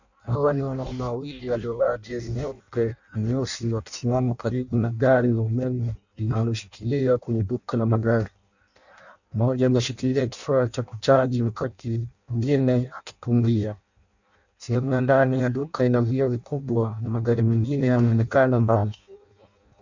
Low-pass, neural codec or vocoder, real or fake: 7.2 kHz; codec, 16 kHz in and 24 kHz out, 0.6 kbps, FireRedTTS-2 codec; fake